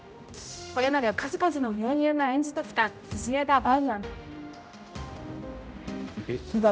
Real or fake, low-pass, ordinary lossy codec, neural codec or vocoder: fake; none; none; codec, 16 kHz, 0.5 kbps, X-Codec, HuBERT features, trained on general audio